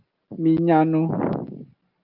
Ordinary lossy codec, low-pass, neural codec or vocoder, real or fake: Opus, 24 kbps; 5.4 kHz; none; real